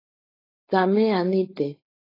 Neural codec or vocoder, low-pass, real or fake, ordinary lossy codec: codec, 16 kHz, 4.8 kbps, FACodec; 5.4 kHz; fake; AAC, 24 kbps